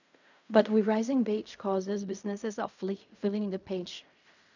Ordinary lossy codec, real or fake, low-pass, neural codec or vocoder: none; fake; 7.2 kHz; codec, 16 kHz in and 24 kHz out, 0.4 kbps, LongCat-Audio-Codec, fine tuned four codebook decoder